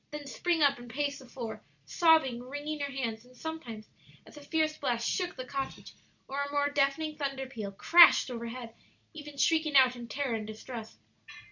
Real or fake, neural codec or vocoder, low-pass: real; none; 7.2 kHz